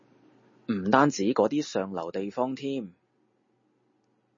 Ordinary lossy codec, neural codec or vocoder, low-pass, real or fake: MP3, 32 kbps; none; 7.2 kHz; real